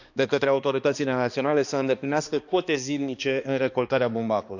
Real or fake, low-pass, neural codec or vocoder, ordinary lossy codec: fake; 7.2 kHz; codec, 16 kHz, 2 kbps, X-Codec, HuBERT features, trained on balanced general audio; none